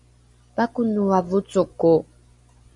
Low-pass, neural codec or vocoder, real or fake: 10.8 kHz; none; real